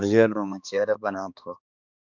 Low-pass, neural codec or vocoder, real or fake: 7.2 kHz; codec, 16 kHz, 2 kbps, X-Codec, HuBERT features, trained on balanced general audio; fake